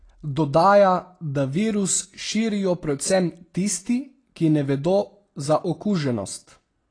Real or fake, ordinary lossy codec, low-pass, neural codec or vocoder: real; AAC, 32 kbps; 9.9 kHz; none